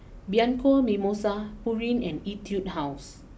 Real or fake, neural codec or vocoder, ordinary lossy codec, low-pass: real; none; none; none